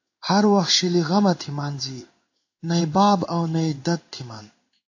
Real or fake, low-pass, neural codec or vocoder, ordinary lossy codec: fake; 7.2 kHz; codec, 16 kHz in and 24 kHz out, 1 kbps, XY-Tokenizer; AAC, 48 kbps